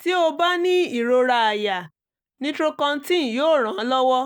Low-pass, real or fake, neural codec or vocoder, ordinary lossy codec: none; real; none; none